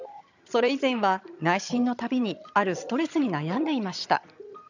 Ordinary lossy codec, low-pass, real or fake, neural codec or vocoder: none; 7.2 kHz; fake; vocoder, 22.05 kHz, 80 mel bands, HiFi-GAN